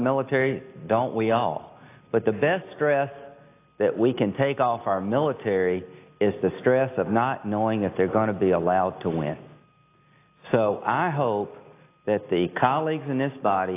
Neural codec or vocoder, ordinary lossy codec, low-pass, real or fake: none; AAC, 24 kbps; 3.6 kHz; real